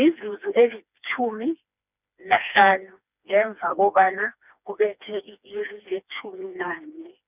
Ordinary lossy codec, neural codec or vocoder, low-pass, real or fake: none; codec, 16 kHz, 2 kbps, FreqCodec, smaller model; 3.6 kHz; fake